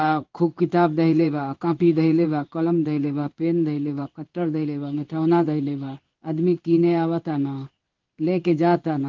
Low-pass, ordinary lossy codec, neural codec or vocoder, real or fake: 7.2 kHz; Opus, 32 kbps; codec, 16 kHz in and 24 kHz out, 1 kbps, XY-Tokenizer; fake